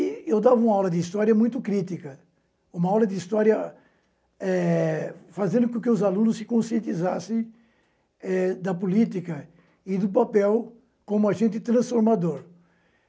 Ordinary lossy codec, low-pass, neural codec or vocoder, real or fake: none; none; none; real